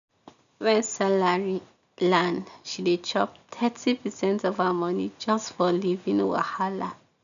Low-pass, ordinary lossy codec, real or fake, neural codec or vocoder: 7.2 kHz; none; real; none